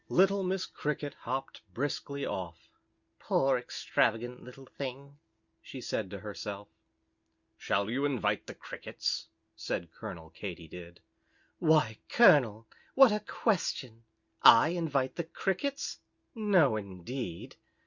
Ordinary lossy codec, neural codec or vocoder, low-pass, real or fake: Opus, 64 kbps; none; 7.2 kHz; real